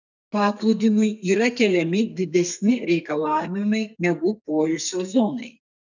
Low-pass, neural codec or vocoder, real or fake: 7.2 kHz; codec, 32 kHz, 1.9 kbps, SNAC; fake